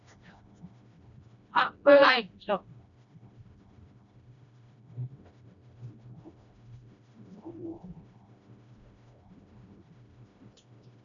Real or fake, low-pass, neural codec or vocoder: fake; 7.2 kHz; codec, 16 kHz, 1 kbps, FreqCodec, smaller model